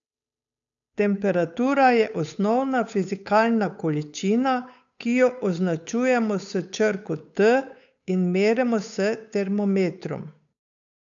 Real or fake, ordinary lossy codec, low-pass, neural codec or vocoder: fake; none; 7.2 kHz; codec, 16 kHz, 8 kbps, FunCodec, trained on Chinese and English, 25 frames a second